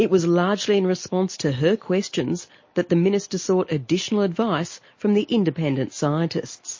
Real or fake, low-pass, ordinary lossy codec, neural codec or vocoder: real; 7.2 kHz; MP3, 32 kbps; none